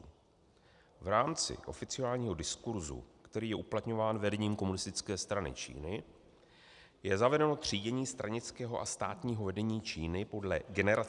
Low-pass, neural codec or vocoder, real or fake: 10.8 kHz; none; real